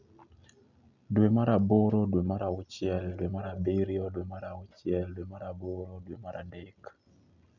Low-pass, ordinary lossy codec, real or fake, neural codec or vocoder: 7.2 kHz; none; real; none